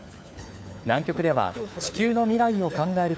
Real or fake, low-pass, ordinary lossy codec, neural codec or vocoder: fake; none; none; codec, 16 kHz, 4 kbps, FunCodec, trained on LibriTTS, 50 frames a second